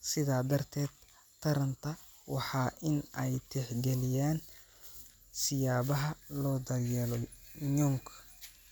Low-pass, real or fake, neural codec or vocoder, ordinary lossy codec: none; real; none; none